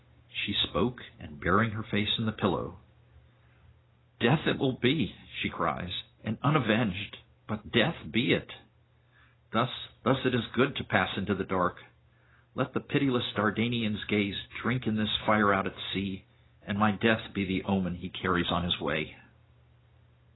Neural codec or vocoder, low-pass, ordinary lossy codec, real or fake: none; 7.2 kHz; AAC, 16 kbps; real